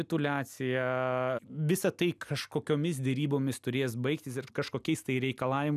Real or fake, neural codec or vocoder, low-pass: real; none; 14.4 kHz